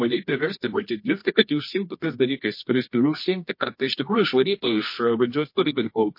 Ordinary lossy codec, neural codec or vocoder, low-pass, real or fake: MP3, 32 kbps; codec, 24 kHz, 0.9 kbps, WavTokenizer, medium music audio release; 5.4 kHz; fake